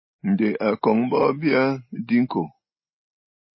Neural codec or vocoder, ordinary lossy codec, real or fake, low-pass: none; MP3, 24 kbps; real; 7.2 kHz